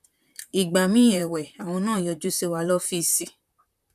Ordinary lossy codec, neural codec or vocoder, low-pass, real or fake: none; vocoder, 44.1 kHz, 128 mel bands, Pupu-Vocoder; 14.4 kHz; fake